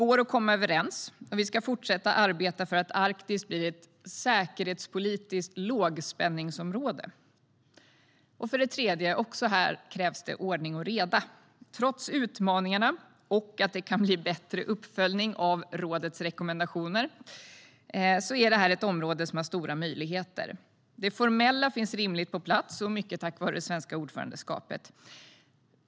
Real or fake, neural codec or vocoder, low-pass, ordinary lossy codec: real; none; none; none